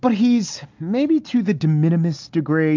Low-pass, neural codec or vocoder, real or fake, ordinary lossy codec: 7.2 kHz; none; real; AAC, 48 kbps